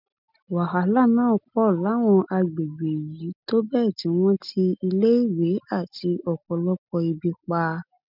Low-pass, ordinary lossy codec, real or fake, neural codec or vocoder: 5.4 kHz; none; real; none